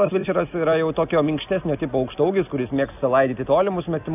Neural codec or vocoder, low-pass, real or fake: none; 3.6 kHz; real